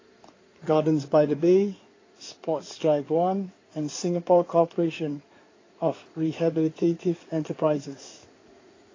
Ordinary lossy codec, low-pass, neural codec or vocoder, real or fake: AAC, 32 kbps; 7.2 kHz; codec, 16 kHz in and 24 kHz out, 2.2 kbps, FireRedTTS-2 codec; fake